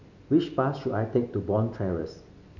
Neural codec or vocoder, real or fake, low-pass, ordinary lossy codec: none; real; 7.2 kHz; none